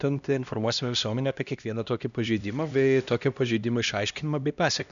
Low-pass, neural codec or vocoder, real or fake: 7.2 kHz; codec, 16 kHz, 1 kbps, X-Codec, HuBERT features, trained on LibriSpeech; fake